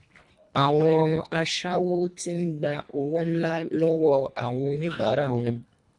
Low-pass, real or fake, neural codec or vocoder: 10.8 kHz; fake; codec, 24 kHz, 1.5 kbps, HILCodec